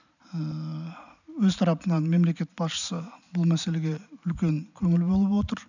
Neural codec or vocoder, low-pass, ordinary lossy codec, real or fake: none; 7.2 kHz; none; real